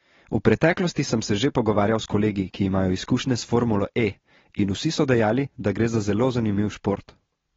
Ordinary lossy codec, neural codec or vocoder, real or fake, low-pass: AAC, 24 kbps; none; real; 7.2 kHz